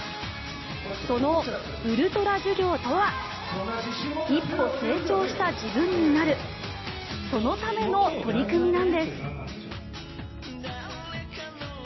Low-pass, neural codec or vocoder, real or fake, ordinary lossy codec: 7.2 kHz; none; real; MP3, 24 kbps